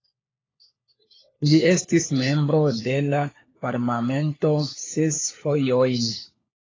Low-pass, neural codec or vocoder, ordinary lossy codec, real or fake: 7.2 kHz; codec, 16 kHz, 4 kbps, FunCodec, trained on LibriTTS, 50 frames a second; AAC, 32 kbps; fake